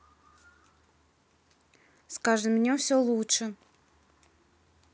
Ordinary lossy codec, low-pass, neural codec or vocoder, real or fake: none; none; none; real